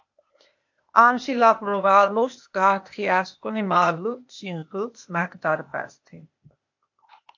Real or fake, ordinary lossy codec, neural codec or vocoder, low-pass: fake; MP3, 48 kbps; codec, 16 kHz, 0.8 kbps, ZipCodec; 7.2 kHz